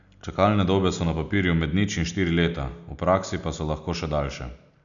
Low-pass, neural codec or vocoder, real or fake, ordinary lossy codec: 7.2 kHz; none; real; none